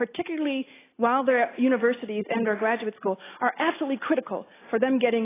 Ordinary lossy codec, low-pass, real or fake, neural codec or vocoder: AAC, 16 kbps; 3.6 kHz; real; none